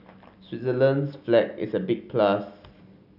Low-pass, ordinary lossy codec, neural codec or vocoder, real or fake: 5.4 kHz; none; none; real